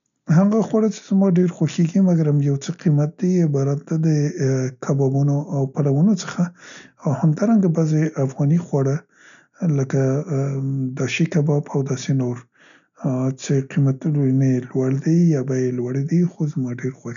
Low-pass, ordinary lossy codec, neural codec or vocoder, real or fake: 7.2 kHz; none; none; real